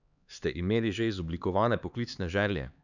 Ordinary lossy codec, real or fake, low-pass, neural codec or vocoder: none; fake; 7.2 kHz; codec, 16 kHz, 4 kbps, X-Codec, HuBERT features, trained on LibriSpeech